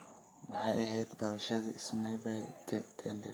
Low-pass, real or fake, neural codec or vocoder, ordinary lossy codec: none; fake; codec, 44.1 kHz, 3.4 kbps, Pupu-Codec; none